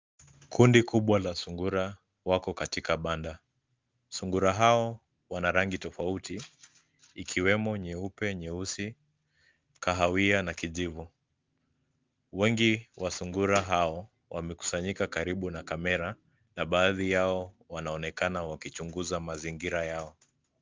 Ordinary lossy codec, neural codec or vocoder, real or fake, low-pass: Opus, 24 kbps; none; real; 7.2 kHz